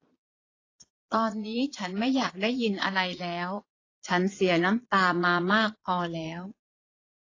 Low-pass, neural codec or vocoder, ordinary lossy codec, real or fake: 7.2 kHz; vocoder, 22.05 kHz, 80 mel bands, Vocos; AAC, 32 kbps; fake